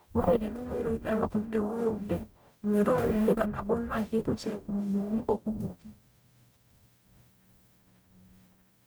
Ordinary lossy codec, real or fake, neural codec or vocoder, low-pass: none; fake; codec, 44.1 kHz, 0.9 kbps, DAC; none